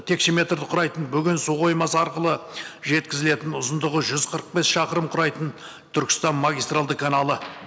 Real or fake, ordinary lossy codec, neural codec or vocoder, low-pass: real; none; none; none